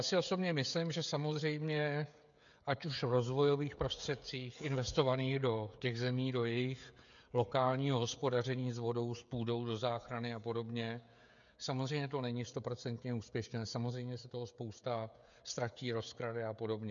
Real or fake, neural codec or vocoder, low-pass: fake; codec, 16 kHz, 16 kbps, FreqCodec, smaller model; 7.2 kHz